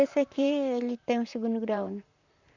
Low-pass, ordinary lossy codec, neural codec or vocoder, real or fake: 7.2 kHz; none; vocoder, 44.1 kHz, 128 mel bands, Pupu-Vocoder; fake